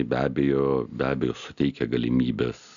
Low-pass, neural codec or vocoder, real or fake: 7.2 kHz; none; real